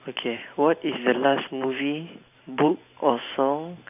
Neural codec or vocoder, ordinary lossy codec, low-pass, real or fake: vocoder, 44.1 kHz, 128 mel bands every 512 samples, BigVGAN v2; none; 3.6 kHz; fake